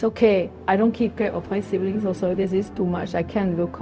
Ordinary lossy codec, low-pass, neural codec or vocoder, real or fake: none; none; codec, 16 kHz, 0.4 kbps, LongCat-Audio-Codec; fake